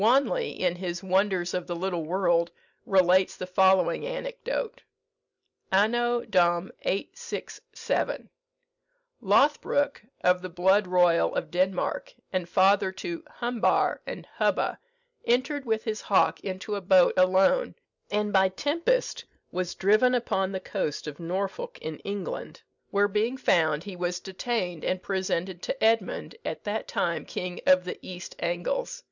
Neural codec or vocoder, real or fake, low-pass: none; real; 7.2 kHz